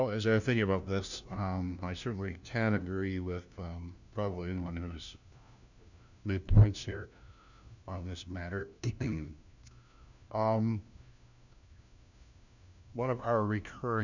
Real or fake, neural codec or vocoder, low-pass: fake; codec, 16 kHz, 1 kbps, FunCodec, trained on LibriTTS, 50 frames a second; 7.2 kHz